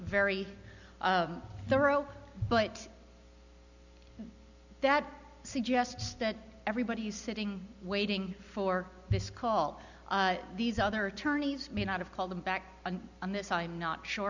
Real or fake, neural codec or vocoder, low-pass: real; none; 7.2 kHz